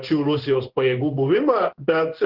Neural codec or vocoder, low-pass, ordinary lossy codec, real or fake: codec, 16 kHz in and 24 kHz out, 1 kbps, XY-Tokenizer; 5.4 kHz; Opus, 16 kbps; fake